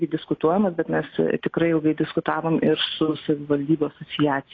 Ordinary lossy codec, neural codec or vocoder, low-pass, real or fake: AAC, 32 kbps; none; 7.2 kHz; real